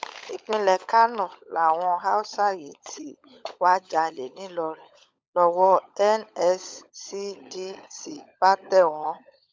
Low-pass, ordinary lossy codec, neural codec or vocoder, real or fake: none; none; codec, 16 kHz, 16 kbps, FunCodec, trained on LibriTTS, 50 frames a second; fake